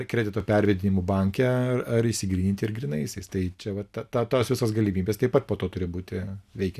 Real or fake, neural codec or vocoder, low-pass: real; none; 14.4 kHz